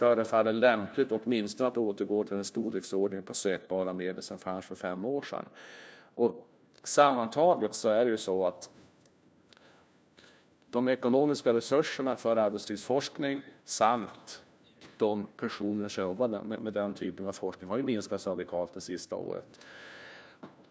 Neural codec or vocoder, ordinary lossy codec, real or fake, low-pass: codec, 16 kHz, 1 kbps, FunCodec, trained on LibriTTS, 50 frames a second; none; fake; none